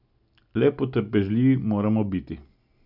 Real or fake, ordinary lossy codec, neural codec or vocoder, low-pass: real; none; none; 5.4 kHz